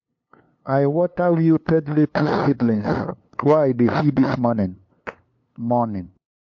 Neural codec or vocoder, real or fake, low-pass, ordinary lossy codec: codec, 16 kHz, 2 kbps, FunCodec, trained on LibriTTS, 25 frames a second; fake; 7.2 kHz; MP3, 48 kbps